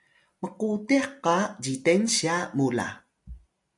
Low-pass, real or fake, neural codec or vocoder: 10.8 kHz; real; none